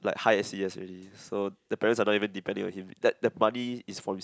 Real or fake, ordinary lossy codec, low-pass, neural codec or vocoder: real; none; none; none